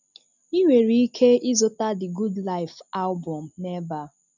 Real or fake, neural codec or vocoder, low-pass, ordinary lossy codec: real; none; 7.2 kHz; none